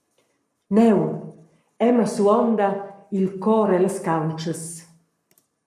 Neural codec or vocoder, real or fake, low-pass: codec, 44.1 kHz, 7.8 kbps, Pupu-Codec; fake; 14.4 kHz